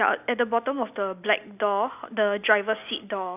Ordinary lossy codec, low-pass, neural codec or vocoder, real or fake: none; 3.6 kHz; none; real